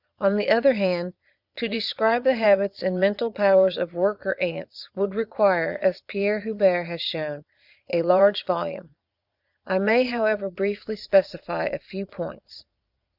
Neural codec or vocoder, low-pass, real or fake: vocoder, 22.05 kHz, 80 mel bands, Vocos; 5.4 kHz; fake